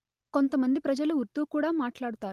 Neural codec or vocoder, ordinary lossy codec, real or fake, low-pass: none; Opus, 24 kbps; real; 14.4 kHz